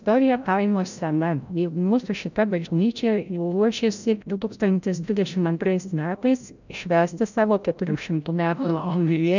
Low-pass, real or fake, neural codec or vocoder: 7.2 kHz; fake; codec, 16 kHz, 0.5 kbps, FreqCodec, larger model